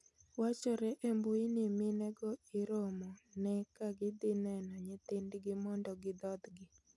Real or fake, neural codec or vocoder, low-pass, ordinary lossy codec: real; none; none; none